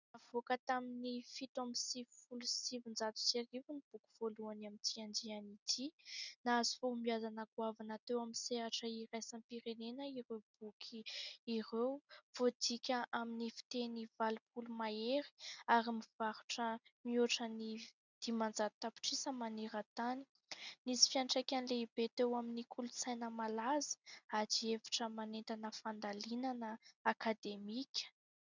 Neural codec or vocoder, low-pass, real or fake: none; 7.2 kHz; real